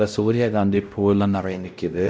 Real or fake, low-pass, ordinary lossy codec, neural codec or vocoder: fake; none; none; codec, 16 kHz, 0.5 kbps, X-Codec, WavLM features, trained on Multilingual LibriSpeech